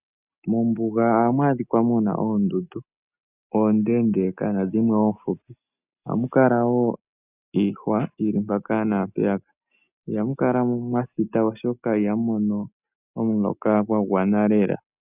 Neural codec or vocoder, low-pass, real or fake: none; 3.6 kHz; real